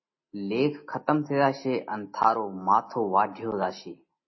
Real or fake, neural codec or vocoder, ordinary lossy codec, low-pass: real; none; MP3, 24 kbps; 7.2 kHz